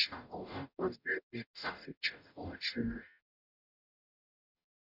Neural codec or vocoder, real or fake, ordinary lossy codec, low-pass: codec, 44.1 kHz, 0.9 kbps, DAC; fake; none; 5.4 kHz